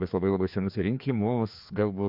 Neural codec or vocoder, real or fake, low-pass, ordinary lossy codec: codec, 16 kHz, 2 kbps, FreqCodec, larger model; fake; 5.4 kHz; MP3, 48 kbps